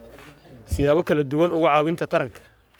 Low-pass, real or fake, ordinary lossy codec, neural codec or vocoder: none; fake; none; codec, 44.1 kHz, 3.4 kbps, Pupu-Codec